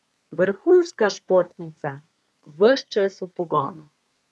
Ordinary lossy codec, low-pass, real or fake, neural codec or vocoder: none; none; fake; codec, 24 kHz, 1 kbps, SNAC